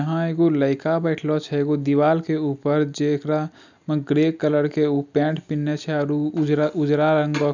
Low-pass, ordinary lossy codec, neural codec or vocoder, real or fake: 7.2 kHz; none; none; real